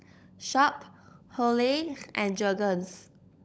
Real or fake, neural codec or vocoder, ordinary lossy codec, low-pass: fake; codec, 16 kHz, 16 kbps, FunCodec, trained on LibriTTS, 50 frames a second; none; none